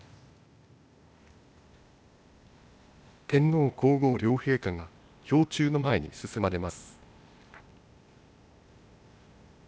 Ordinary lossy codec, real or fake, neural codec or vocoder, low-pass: none; fake; codec, 16 kHz, 0.8 kbps, ZipCodec; none